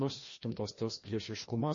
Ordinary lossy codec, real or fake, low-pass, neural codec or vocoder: MP3, 32 kbps; fake; 7.2 kHz; codec, 16 kHz, 1 kbps, FreqCodec, larger model